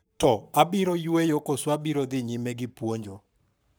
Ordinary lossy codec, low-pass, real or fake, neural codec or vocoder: none; none; fake; codec, 44.1 kHz, 7.8 kbps, Pupu-Codec